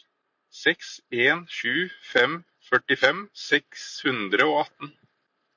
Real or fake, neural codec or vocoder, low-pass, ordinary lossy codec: real; none; 7.2 kHz; MP3, 48 kbps